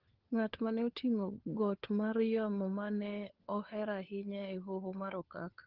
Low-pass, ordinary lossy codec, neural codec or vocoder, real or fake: 5.4 kHz; Opus, 16 kbps; codec, 16 kHz, 4 kbps, FunCodec, trained on LibriTTS, 50 frames a second; fake